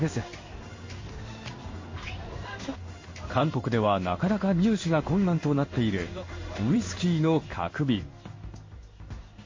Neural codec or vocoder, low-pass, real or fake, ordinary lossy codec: codec, 16 kHz in and 24 kHz out, 1 kbps, XY-Tokenizer; 7.2 kHz; fake; MP3, 32 kbps